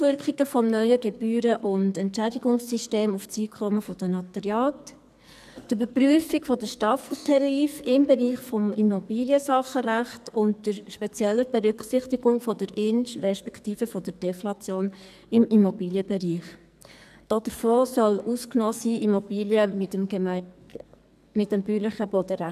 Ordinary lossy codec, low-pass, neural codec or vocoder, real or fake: none; 14.4 kHz; codec, 44.1 kHz, 2.6 kbps, SNAC; fake